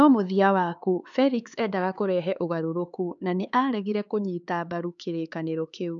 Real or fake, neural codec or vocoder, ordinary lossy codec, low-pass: fake; codec, 16 kHz, 4 kbps, X-Codec, HuBERT features, trained on LibriSpeech; none; 7.2 kHz